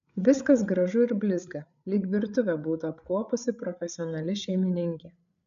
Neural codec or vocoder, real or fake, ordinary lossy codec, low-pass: codec, 16 kHz, 8 kbps, FreqCodec, larger model; fake; MP3, 96 kbps; 7.2 kHz